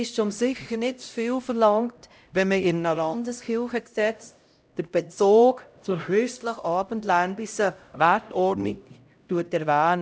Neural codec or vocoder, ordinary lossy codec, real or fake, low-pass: codec, 16 kHz, 0.5 kbps, X-Codec, HuBERT features, trained on LibriSpeech; none; fake; none